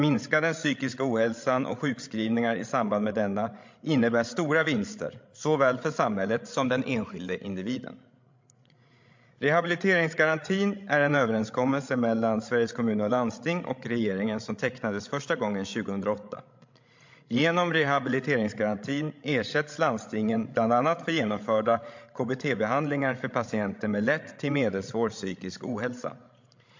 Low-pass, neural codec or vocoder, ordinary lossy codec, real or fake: 7.2 kHz; codec, 16 kHz, 16 kbps, FreqCodec, larger model; MP3, 48 kbps; fake